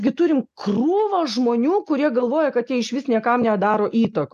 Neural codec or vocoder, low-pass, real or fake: none; 14.4 kHz; real